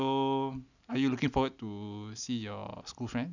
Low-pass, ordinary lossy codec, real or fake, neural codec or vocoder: 7.2 kHz; none; real; none